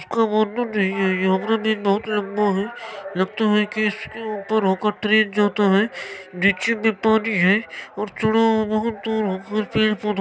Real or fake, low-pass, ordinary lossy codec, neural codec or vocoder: real; none; none; none